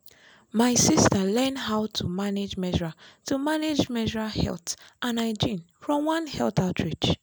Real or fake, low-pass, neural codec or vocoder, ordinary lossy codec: real; none; none; none